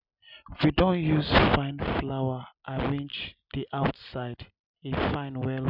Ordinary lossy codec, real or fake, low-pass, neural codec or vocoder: none; real; 5.4 kHz; none